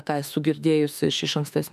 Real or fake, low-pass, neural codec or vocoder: fake; 14.4 kHz; autoencoder, 48 kHz, 32 numbers a frame, DAC-VAE, trained on Japanese speech